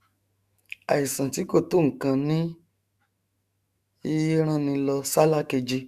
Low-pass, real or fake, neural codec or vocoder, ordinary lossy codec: 14.4 kHz; fake; autoencoder, 48 kHz, 128 numbers a frame, DAC-VAE, trained on Japanese speech; Opus, 64 kbps